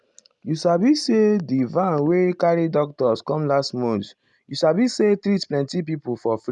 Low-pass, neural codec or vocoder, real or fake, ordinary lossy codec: 10.8 kHz; none; real; none